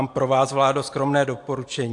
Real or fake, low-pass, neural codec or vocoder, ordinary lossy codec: real; 10.8 kHz; none; AAC, 64 kbps